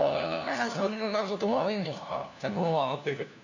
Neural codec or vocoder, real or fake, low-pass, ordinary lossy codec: codec, 16 kHz, 1 kbps, FunCodec, trained on LibriTTS, 50 frames a second; fake; 7.2 kHz; none